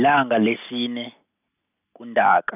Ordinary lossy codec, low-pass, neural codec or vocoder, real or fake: none; 3.6 kHz; none; real